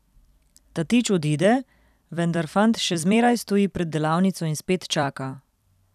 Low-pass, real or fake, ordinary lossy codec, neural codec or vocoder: 14.4 kHz; fake; none; vocoder, 44.1 kHz, 128 mel bands every 256 samples, BigVGAN v2